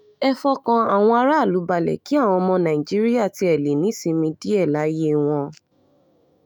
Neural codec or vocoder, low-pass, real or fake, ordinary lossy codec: autoencoder, 48 kHz, 128 numbers a frame, DAC-VAE, trained on Japanese speech; none; fake; none